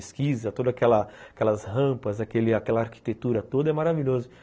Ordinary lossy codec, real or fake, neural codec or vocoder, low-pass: none; real; none; none